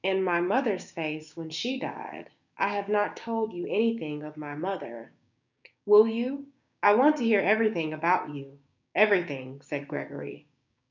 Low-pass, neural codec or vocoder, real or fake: 7.2 kHz; codec, 16 kHz, 6 kbps, DAC; fake